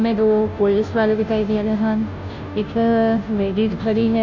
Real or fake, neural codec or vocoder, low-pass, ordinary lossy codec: fake; codec, 16 kHz, 0.5 kbps, FunCodec, trained on Chinese and English, 25 frames a second; 7.2 kHz; none